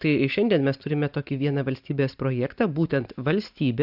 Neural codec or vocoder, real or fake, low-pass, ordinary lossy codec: none; real; 5.4 kHz; AAC, 48 kbps